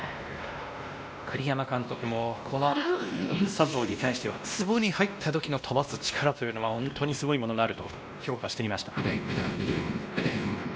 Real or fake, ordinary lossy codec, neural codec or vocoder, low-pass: fake; none; codec, 16 kHz, 1 kbps, X-Codec, WavLM features, trained on Multilingual LibriSpeech; none